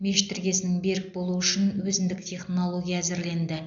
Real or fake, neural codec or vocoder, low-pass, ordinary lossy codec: real; none; 7.2 kHz; AAC, 64 kbps